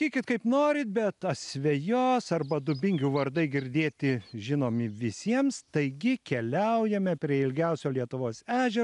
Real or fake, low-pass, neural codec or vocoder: real; 10.8 kHz; none